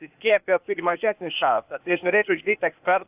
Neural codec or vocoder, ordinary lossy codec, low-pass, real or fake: codec, 16 kHz, 0.8 kbps, ZipCodec; AAC, 32 kbps; 3.6 kHz; fake